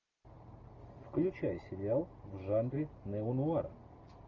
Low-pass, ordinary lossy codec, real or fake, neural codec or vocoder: 7.2 kHz; Opus, 32 kbps; real; none